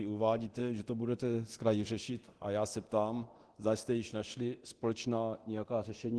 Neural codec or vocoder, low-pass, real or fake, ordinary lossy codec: codec, 24 kHz, 0.9 kbps, DualCodec; 10.8 kHz; fake; Opus, 16 kbps